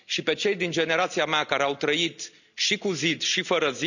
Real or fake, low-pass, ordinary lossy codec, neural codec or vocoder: real; 7.2 kHz; none; none